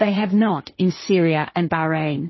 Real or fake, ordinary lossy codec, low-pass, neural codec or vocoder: fake; MP3, 24 kbps; 7.2 kHz; codec, 16 kHz, 1.1 kbps, Voila-Tokenizer